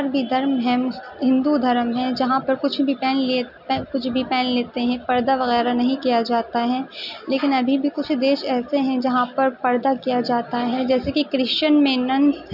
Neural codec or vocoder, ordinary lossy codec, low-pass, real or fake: none; none; 5.4 kHz; real